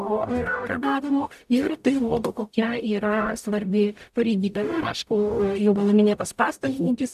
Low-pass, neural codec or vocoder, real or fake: 14.4 kHz; codec, 44.1 kHz, 0.9 kbps, DAC; fake